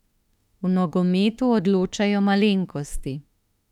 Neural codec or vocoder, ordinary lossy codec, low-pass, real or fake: autoencoder, 48 kHz, 32 numbers a frame, DAC-VAE, trained on Japanese speech; none; 19.8 kHz; fake